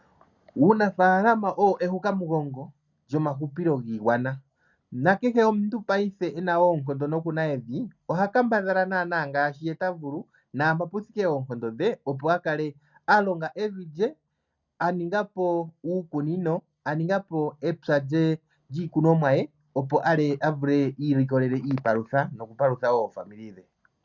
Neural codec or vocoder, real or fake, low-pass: none; real; 7.2 kHz